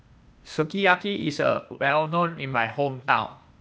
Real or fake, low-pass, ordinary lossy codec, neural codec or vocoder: fake; none; none; codec, 16 kHz, 0.8 kbps, ZipCodec